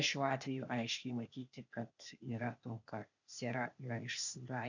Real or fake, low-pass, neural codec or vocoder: fake; 7.2 kHz; codec, 16 kHz, 0.8 kbps, ZipCodec